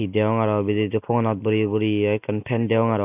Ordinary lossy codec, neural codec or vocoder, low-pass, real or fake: none; none; 3.6 kHz; real